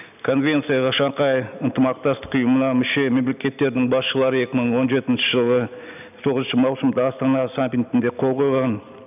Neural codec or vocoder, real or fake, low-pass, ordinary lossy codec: none; real; 3.6 kHz; none